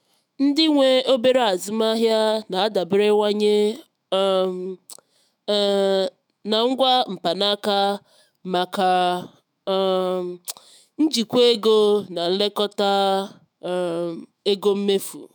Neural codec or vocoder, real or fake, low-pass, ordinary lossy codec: autoencoder, 48 kHz, 128 numbers a frame, DAC-VAE, trained on Japanese speech; fake; none; none